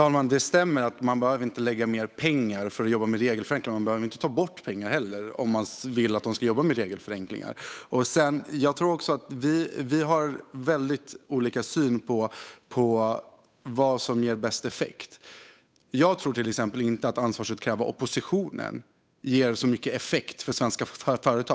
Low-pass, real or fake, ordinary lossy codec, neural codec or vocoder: none; fake; none; codec, 16 kHz, 8 kbps, FunCodec, trained on Chinese and English, 25 frames a second